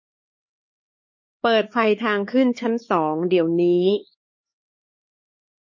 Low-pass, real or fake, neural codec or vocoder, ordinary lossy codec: 7.2 kHz; fake; codec, 16 kHz, 4 kbps, X-Codec, HuBERT features, trained on LibriSpeech; MP3, 32 kbps